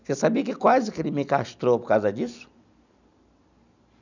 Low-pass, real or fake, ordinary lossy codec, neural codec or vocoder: 7.2 kHz; real; none; none